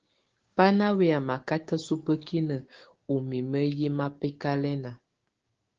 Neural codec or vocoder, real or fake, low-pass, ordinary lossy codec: codec, 16 kHz, 6 kbps, DAC; fake; 7.2 kHz; Opus, 16 kbps